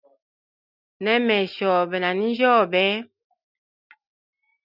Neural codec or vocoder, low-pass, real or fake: none; 5.4 kHz; real